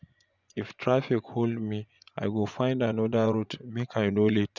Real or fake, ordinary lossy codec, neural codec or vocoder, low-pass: fake; none; vocoder, 24 kHz, 100 mel bands, Vocos; 7.2 kHz